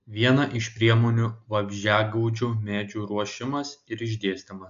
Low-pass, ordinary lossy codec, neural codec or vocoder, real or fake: 7.2 kHz; AAC, 64 kbps; none; real